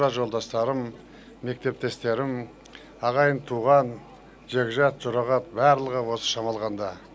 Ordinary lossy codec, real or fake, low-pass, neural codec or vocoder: none; real; none; none